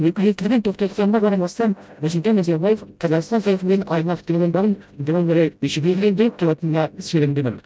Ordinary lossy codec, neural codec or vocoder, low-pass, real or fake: none; codec, 16 kHz, 0.5 kbps, FreqCodec, smaller model; none; fake